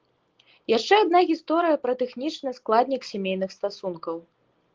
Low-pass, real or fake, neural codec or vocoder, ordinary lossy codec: 7.2 kHz; real; none; Opus, 16 kbps